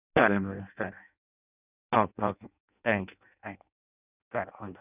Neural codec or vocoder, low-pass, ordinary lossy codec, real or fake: codec, 16 kHz in and 24 kHz out, 0.6 kbps, FireRedTTS-2 codec; 3.6 kHz; none; fake